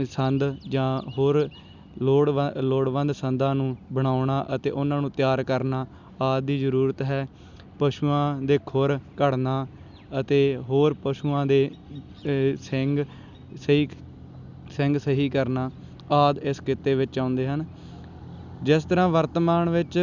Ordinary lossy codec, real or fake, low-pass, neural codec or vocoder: none; real; 7.2 kHz; none